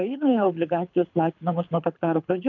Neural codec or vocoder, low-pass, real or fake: codec, 24 kHz, 6 kbps, HILCodec; 7.2 kHz; fake